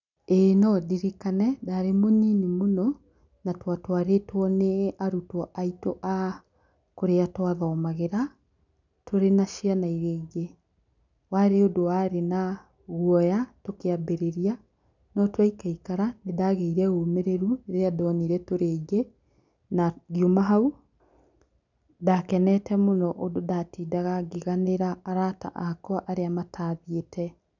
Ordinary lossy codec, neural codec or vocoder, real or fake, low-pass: none; none; real; 7.2 kHz